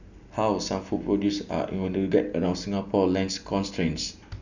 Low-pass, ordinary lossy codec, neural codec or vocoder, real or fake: 7.2 kHz; none; none; real